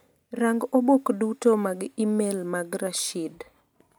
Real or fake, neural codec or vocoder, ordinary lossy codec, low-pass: real; none; none; none